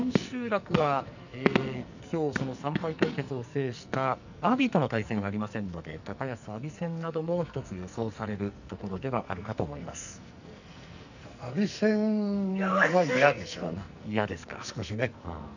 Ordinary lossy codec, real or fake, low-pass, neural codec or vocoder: none; fake; 7.2 kHz; codec, 44.1 kHz, 2.6 kbps, SNAC